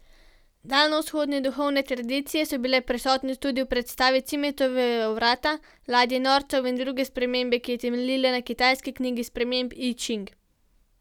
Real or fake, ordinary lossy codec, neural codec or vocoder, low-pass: real; none; none; 19.8 kHz